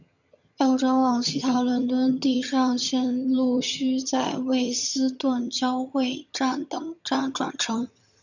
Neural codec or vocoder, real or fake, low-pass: vocoder, 22.05 kHz, 80 mel bands, HiFi-GAN; fake; 7.2 kHz